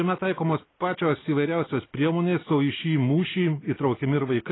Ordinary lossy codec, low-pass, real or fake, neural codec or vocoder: AAC, 16 kbps; 7.2 kHz; real; none